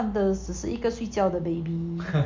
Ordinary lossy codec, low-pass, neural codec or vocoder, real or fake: MP3, 48 kbps; 7.2 kHz; none; real